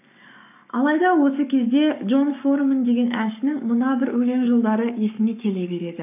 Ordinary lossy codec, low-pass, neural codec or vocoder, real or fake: none; 3.6 kHz; codec, 44.1 kHz, 7.8 kbps, Pupu-Codec; fake